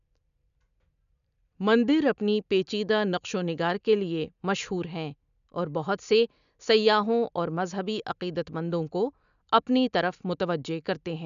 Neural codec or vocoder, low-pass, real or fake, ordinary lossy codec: none; 7.2 kHz; real; none